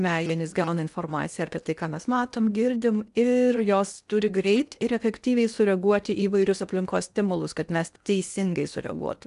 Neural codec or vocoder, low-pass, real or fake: codec, 16 kHz in and 24 kHz out, 0.8 kbps, FocalCodec, streaming, 65536 codes; 10.8 kHz; fake